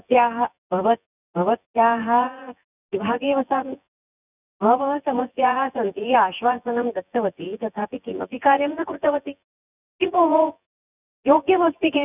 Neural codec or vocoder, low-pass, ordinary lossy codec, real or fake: vocoder, 24 kHz, 100 mel bands, Vocos; 3.6 kHz; none; fake